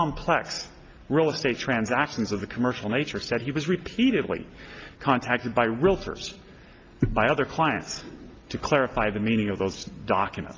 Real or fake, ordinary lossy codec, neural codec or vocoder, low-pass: real; Opus, 24 kbps; none; 7.2 kHz